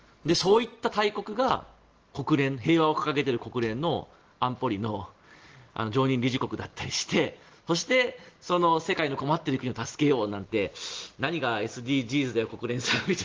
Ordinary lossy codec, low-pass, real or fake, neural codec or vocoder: Opus, 16 kbps; 7.2 kHz; real; none